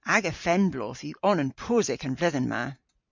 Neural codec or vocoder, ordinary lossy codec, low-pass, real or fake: vocoder, 44.1 kHz, 128 mel bands every 512 samples, BigVGAN v2; MP3, 64 kbps; 7.2 kHz; fake